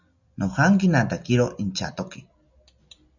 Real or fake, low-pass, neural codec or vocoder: real; 7.2 kHz; none